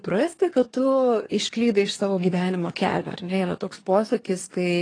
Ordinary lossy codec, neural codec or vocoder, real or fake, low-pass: AAC, 32 kbps; codec, 24 kHz, 1 kbps, SNAC; fake; 9.9 kHz